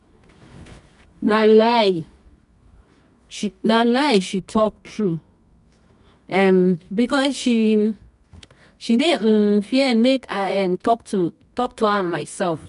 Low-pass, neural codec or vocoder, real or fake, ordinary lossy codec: 10.8 kHz; codec, 24 kHz, 0.9 kbps, WavTokenizer, medium music audio release; fake; none